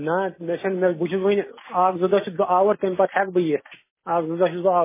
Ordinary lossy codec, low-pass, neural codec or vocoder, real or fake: MP3, 16 kbps; 3.6 kHz; none; real